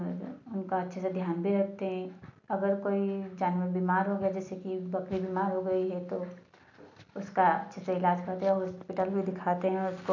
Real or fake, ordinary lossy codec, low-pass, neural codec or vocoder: real; none; 7.2 kHz; none